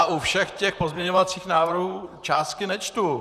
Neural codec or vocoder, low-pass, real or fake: vocoder, 44.1 kHz, 128 mel bands, Pupu-Vocoder; 14.4 kHz; fake